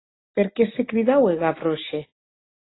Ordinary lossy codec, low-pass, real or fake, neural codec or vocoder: AAC, 16 kbps; 7.2 kHz; real; none